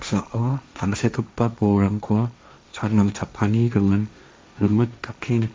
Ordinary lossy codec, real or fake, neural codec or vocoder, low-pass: none; fake; codec, 16 kHz, 1.1 kbps, Voila-Tokenizer; none